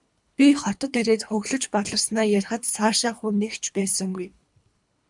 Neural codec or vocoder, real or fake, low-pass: codec, 24 kHz, 3 kbps, HILCodec; fake; 10.8 kHz